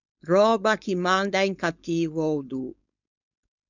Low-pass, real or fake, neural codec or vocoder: 7.2 kHz; fake; codec, 16 kHz, 4.8 kbps, FACodec